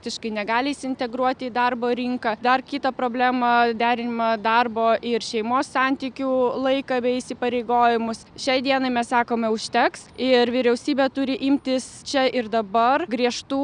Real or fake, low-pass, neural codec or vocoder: real; 9.9 kHz; none